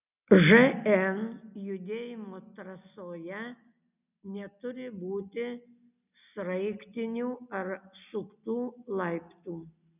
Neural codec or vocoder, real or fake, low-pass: none; real; 3.6 kHz